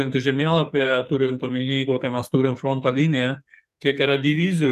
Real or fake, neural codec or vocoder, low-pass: fake; codec, 44.1 kHz, 2.6 kbps, SNAC; 14.4 kHz